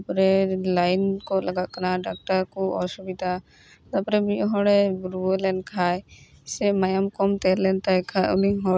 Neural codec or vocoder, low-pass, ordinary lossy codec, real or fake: none; none; none; real